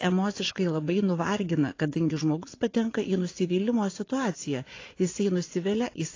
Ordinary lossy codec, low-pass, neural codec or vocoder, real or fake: AAC, 32 kbps; 7.2 kHz; vocoder, 24 kHz, 100 mel bands, Vocos; fake